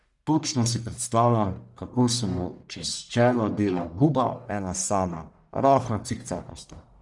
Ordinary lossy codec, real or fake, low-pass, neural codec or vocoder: none; fake; 10.8 kHz; codec, 44.1 kHz, 1.7 kbps, Pupu-Codec